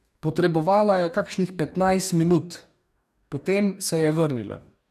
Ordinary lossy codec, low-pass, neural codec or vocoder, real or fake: none; 14.4 kHz; codec, 44.1 kHz, 2.6 kbps, DAC; fake